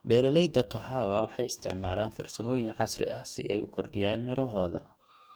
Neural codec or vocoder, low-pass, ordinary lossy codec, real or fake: codec, 44.1 kHz, 2.6 kbps, DAC; none; none; fake